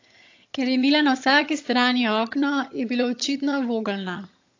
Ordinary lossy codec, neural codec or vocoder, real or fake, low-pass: AAC, 48 kbps; vocoder, 22.05 kHz, 80 mel bands, HiFi-GAN; fake; 7.2 kHz